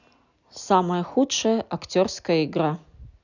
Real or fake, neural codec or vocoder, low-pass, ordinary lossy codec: real; none; 7.2 kHz; none